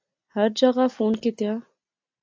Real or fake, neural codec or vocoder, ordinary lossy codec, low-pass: real; none; AAC, 48 kbps; 7.2 kHz